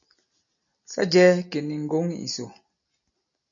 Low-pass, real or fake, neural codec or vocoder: 7.2 kHz; real; none